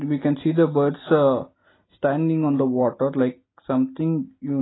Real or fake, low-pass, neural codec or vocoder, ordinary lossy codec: real; 7.2 kHz; none; AAC, 16 kbps